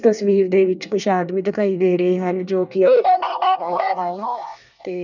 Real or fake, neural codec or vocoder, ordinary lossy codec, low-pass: fake; codec, 24 kHz, 1 kbps, SNAC; none; 7.2 kHz